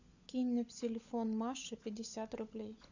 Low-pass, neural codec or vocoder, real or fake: 7.2 kHz; codec, 16 kHz, 16 kbps, FunCodec, trained on LibriTTS, 50 frames a second; fake